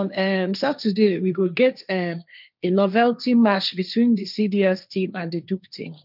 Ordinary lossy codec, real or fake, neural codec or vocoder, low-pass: none; fake; codec, 16 kHz, 1.1 kbps, Voila-Tokenizer; 5.4 kHz